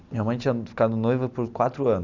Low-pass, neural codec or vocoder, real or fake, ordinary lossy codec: 7.2 kHz; none; real; Opus, 64 kbps